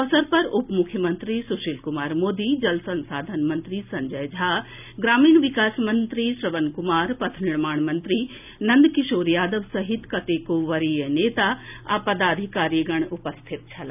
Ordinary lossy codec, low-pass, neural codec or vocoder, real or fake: none; 3.6 kHz; none; real